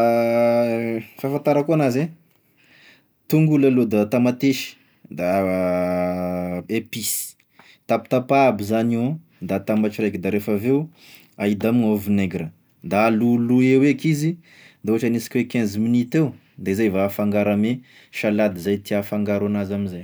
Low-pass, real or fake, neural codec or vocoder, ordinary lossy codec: none; real; none; none